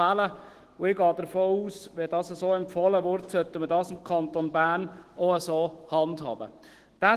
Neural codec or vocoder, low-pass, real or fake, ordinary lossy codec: autoencoder, 48 kHz, 128 numbers a frame, DAC-VAE, trained on Japanese speech; 14.4 kHz; fake; Opus, 16 kbps